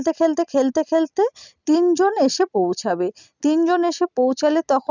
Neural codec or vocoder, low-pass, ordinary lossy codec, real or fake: none; 7.2 kHz; none; real